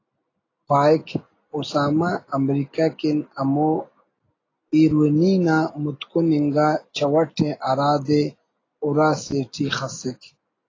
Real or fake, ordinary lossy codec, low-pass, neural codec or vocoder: real; AAC, 32 kbps; 7.2 kHz; none